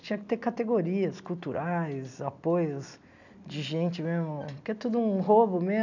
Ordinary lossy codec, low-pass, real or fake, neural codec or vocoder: none; 7.2 kHz; real; none